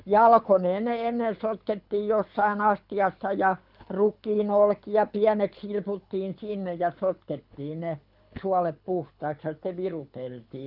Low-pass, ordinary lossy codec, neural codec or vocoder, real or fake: 5.4 kHz; none; codec, 24 kHz, 6 kbps, HILCodec; fake